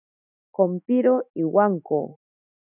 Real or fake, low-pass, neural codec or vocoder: fake; 3.6 kHz; autoencoder, 48 kHz, 128 numbers a frame, DAC-VAE, trained on Japanese speech